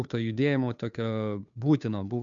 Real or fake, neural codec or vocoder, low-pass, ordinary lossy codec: fake; codec, 16 kHz, 2 kbps, FunCodec, trained on Chinese and English, 25 frames a second; 7.2 kHz; MP3, 96 kbps